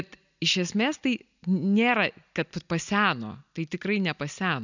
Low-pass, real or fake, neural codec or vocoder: 7.2 kHz; real; none